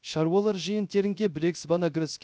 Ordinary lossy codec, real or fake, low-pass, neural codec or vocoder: none; fake; none; codec, 16 kHz, 0.3 kbps, FocalCodec